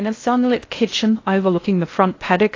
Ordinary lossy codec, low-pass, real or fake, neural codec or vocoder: AAC, 32 kbps; 7.2 kHz; fake; codec, 16 kHz in and 24 kHz out, 0.6 kbps, FocalCodec, streaming, 2048 codes